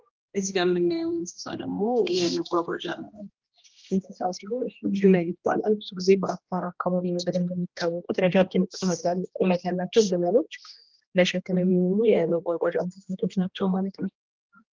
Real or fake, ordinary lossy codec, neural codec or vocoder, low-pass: fake; Opus, 32 kbps; codec, 16 kHz, 1 kbps, X-Codec, HuBERT features, trained on general audio; 7.2 kHz